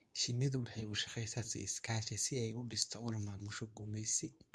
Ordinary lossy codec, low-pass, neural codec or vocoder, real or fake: none; none; codec, 24 kHz, 0.9 kbps, WavTokenizer, medium speech release version 2; fake